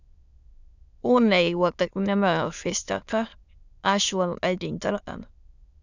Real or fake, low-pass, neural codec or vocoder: fake; 7.2 kHz; autoencoder, 22.05 kHz, a latent of 192 numbers a frame, VITS, trained on many speakers